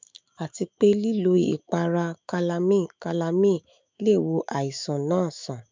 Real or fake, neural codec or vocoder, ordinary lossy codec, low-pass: fake; autoencoder, 48 kHz, 128 numbers a frame, DAC-VAE, trained on Japanese speech; MP3, 64 kbps; 7.2 kHz